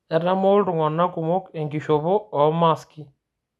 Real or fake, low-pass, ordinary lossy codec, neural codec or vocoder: real; none; none; none